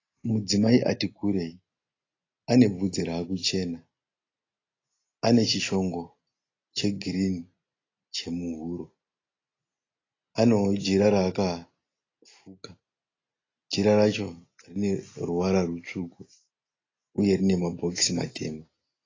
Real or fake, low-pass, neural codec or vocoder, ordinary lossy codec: real; 7.2 kHz; none; AAC, 32 kbps